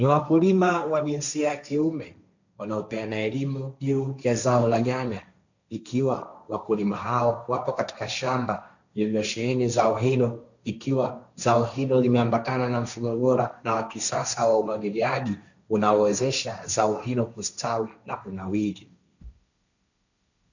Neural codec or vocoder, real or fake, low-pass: codec, 16 kHz, 1.1 kbps, Voila-Tokenizer; fake; 7.2 kHz